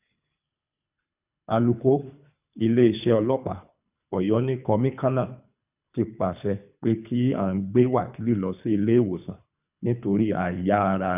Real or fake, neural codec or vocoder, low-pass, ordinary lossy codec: fake; codec, 24 kHz, 3 kbps, HILCodec; 3.6 kHz; none